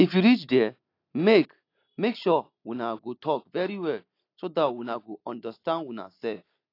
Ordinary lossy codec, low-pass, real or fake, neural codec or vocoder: AAC, 32 kbps; 5.4 kHz; real; none